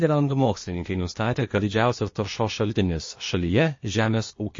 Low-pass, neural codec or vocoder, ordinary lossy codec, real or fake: 7.2 kHz; codec, 16 kHz, 0.8 kbps, ZipCodec; MP3, 32 kbps; fake